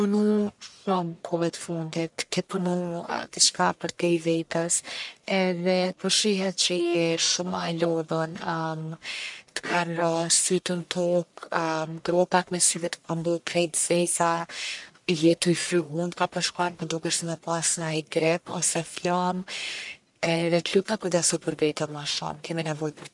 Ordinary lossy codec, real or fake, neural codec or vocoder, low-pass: none; fake; codec, 44.1 kHz, 1.7 kbps, Pupu-Codec; 10.8 kHz